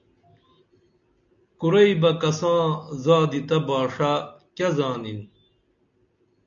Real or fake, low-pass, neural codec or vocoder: real; 7.2 kHz; none